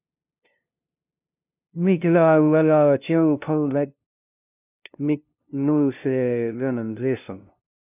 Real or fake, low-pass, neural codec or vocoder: fake; 3.6 kHz; codec, 16 kHz, 0.5 kbps, FunCodec, trained on LibriTTS, 25 frames a second